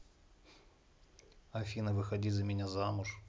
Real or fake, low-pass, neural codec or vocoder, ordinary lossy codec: real; none; none; none